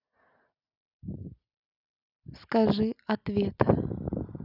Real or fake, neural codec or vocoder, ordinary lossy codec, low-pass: real; none; none; 5.4 kHz